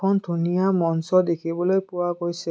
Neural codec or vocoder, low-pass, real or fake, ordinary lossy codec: none; none; real; none